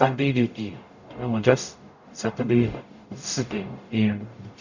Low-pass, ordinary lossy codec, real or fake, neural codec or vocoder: 7.2 kHz; none; fake; codec, 44.1 kHz, 0.9 kbps, DAC